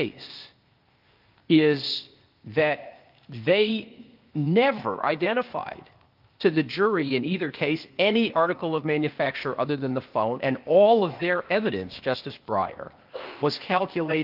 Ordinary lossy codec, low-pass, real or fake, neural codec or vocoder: Opus, 32 kbps; 5.4 kHz; fake; codec, 16 kHz, 0.8 kbps, ZipCodec